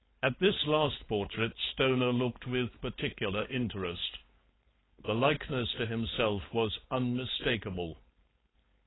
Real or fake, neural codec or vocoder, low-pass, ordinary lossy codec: fake; codec, 16 kHz, 4.8 kbps, FACodec; 7.2 kHz; AAC, 16 kbps